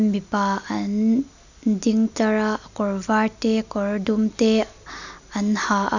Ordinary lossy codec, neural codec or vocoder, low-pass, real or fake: none; none; 7.2 kHz; real